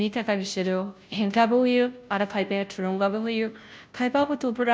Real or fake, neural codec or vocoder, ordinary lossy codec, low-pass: fake; codec, 16 kHz, 0.5 kbps, FunCodec, trained on Chinese and English, 25 frames a second; none; none